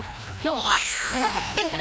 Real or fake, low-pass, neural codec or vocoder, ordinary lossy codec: fake; none; codec, 16 kHz, 1 kbps, FreqCodec, larger model; none